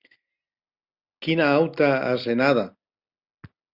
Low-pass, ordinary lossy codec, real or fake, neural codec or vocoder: 5.4 kHz; Opus, 64 kbps; real; none